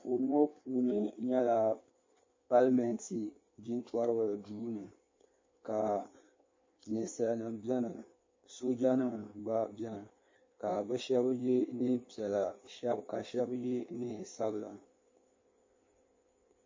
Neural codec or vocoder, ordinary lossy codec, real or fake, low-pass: codec, 16 kHz in and 24 kHz out, 1.1 kbps, FireRedTTS-2 codec; MP3, 32 kbps; fake; 7.2 kHz